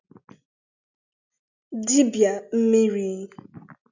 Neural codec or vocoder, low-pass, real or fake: none; 7.2 kHz; real